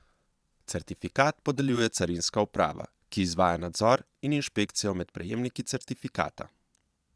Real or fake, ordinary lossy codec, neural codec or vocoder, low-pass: fake; none; vocoder, 22.05 kHz, 80 mel bands, Vocos; none